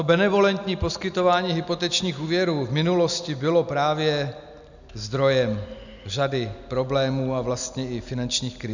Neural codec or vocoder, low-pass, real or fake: none; 7.2 kHz; real